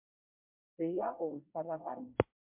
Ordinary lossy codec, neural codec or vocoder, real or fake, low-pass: MP3, 32 kbps; codec, 24 kHz, 1 kbps, SNAC; fake; 3.6 kHz